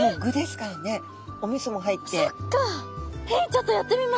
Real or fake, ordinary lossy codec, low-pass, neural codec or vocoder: real; none; none; none